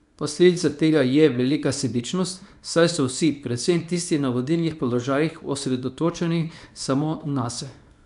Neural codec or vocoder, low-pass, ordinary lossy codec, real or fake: codec, 24 kHz, 0.9 kbps, WavTokenizer, small release; 10.8 kHz; none; fake